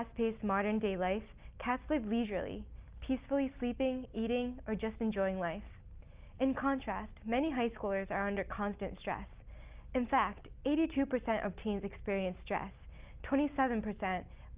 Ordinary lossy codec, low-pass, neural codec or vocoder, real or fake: Opus, 32 kbps; 3.6 kHz; none; real